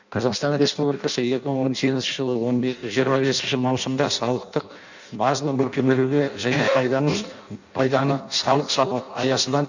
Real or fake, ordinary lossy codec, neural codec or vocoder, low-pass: fake; none; codec, 16 kHz in and 24 kHz out, 0.6 kbps, FireRedTTS-2 codec; 7.2 kHz